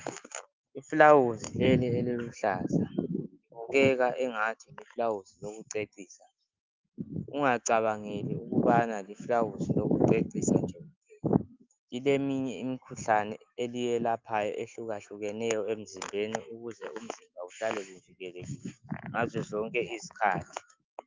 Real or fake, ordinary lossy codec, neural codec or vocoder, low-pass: fake; Opus, 32 kbps; autoencoder, 48 kHz, 128 numbers a frame, DAC-VAE, trained on Japanese speech; 7.2 kHz